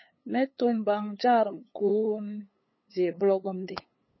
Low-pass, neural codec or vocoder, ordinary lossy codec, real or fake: 7.2 kHz; codec, 16 kHz, 8 kbps, FunCodec, trained on LibriTTS, 25 frames a second; MP3, 24 kbps; fake